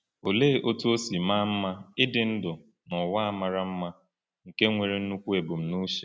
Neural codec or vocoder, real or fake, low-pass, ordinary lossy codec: none; real; none; none